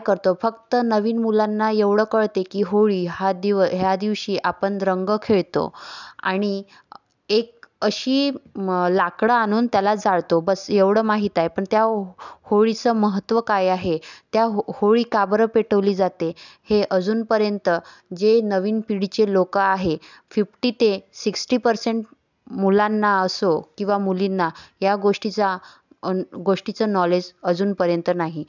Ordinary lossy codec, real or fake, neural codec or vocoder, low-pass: none; real; none; 7.2 kHz